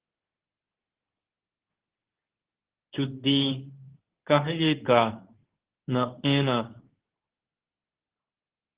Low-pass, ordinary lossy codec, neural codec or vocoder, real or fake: 3.6 kHz; Opus, 16 kbps; codec, 24 kHz, 0.9 kbps, WavTokenizer, medium speech release version 2; fake